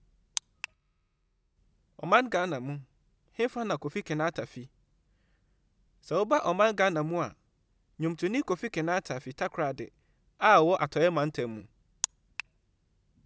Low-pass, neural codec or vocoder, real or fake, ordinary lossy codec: none; none; real; none